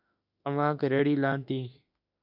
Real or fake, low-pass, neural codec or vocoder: fake; 5.4 kHz; autoencoder, 48 kHz, 32 numbers a frame, DAC-VAE, trained on Japanese speech